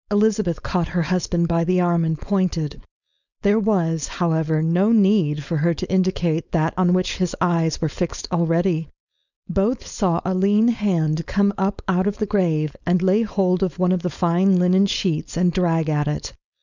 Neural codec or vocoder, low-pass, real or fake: codec, 16 kHz, 4.8 kbps, FACodec; 7.2 kHz; fake